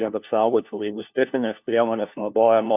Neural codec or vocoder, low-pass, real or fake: codec, 16 kHz, 1 kbps, FunCodec, trained on LibriTTS, 50 frames a second; 3.6 kHz; fake